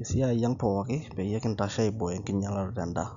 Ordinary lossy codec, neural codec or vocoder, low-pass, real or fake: none; none; 7.2 kHz; real